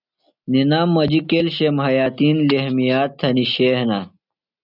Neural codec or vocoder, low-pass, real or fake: none; 5.4 kHz; real